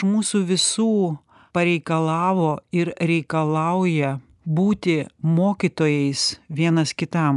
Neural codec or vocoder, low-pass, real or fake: none; 10.8 kHz; real